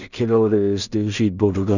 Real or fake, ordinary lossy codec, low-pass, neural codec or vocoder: fake; none; 7.2 kHz; codec, 16 kHz in and 24 kHz out, 0.4 kbps, LongCat-Audio-Codec, two codebook decoder